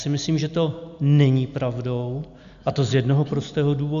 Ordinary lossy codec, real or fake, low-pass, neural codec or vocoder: AAC, 96 kbps; real; 7.2 kHz; none